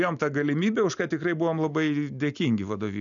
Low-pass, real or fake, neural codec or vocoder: 7.2 kHz; real; none